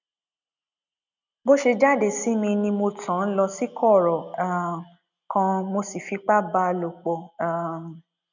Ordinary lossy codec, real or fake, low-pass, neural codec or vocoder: none; real; 7.2 kHz; none